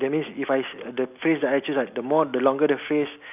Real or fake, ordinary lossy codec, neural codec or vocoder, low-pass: real; none; none; 3.6 kHz